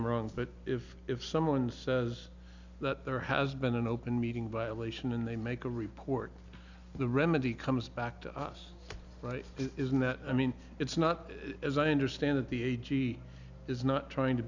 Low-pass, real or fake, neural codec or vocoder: 7.2 kHz; real; none